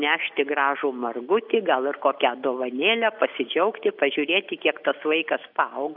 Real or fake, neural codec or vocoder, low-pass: real; none; 5.4 kHz